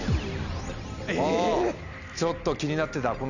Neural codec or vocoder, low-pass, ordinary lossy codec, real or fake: none; 7.2 kHz; none; real